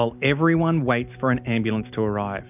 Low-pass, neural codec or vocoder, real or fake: 3.6 kHz; none; real